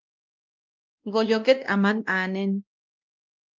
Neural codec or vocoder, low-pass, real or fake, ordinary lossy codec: codec, 16 kHz, 1 kbps, X-Codec, WavLM features, trained on Multilingual LibriSpeech; 7.2 kHz; fake; Opus, 32 kbps